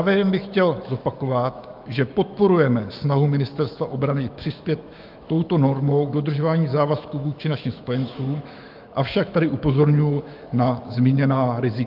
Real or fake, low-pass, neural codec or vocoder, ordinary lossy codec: fake; 5.4 kHz; autoencoder, 48 kHz, 128 numbers a frame, DAC-VAE, trained on Japanese speech; Opus, 24 kbps